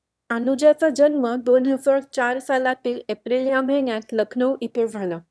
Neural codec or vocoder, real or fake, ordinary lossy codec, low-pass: autoencoder, 22.05 kHz, a latent of 192 numbers a frame, VITS, trained on one speaker; fake; none; none